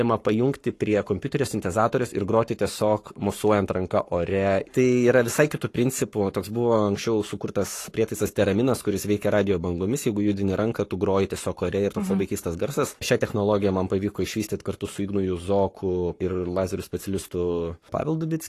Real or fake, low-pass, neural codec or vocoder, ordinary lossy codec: fake; 14.4 kHz; codec, 44.1 kHz, 7.8 kbps, Pupu-Codec; AAC, 48 kbps